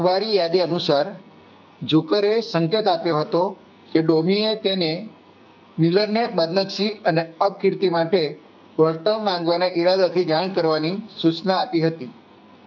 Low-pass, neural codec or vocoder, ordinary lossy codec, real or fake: 7.2 kHz; codec, 44.1 kHz, 2.6 kbps, SNAC; none; fake